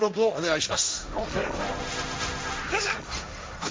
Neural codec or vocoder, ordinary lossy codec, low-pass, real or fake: codec, 16 kHz, 1.1 kbps, Voila-Tokenizer; none; none; fake